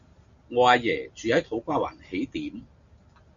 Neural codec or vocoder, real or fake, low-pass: none; real; 7.2 kHz